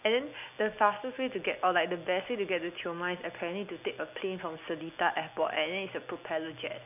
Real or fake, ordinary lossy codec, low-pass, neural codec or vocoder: real; none; 3.6 kHz; none